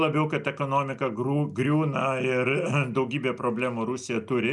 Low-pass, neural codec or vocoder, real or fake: 10.8 kHz; none; real